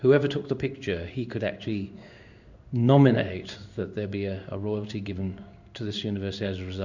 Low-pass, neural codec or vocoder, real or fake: 7.2 kHz; codec, 16 kHz in and 24 kHz out, 1 kbps, XY-Tokenizer; fake